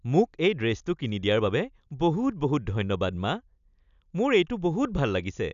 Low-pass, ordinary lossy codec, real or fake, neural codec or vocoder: 7.2 kHz; none; real; none